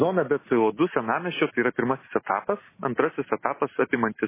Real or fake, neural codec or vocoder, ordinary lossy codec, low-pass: real; none; MP3, 16 kbps; 3.6 kHz